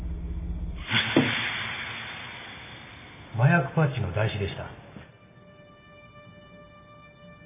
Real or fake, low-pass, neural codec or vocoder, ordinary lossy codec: real; 3.6 kHz; none; MP3, 16 kbps